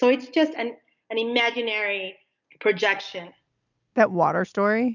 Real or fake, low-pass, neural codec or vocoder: real; 7.2 kHz; none